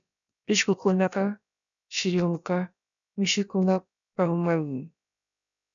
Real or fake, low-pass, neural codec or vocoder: fake; 7.2 kHz; codec, 16 kHz, about 1 kbps, DyCAST, with the encoder's durations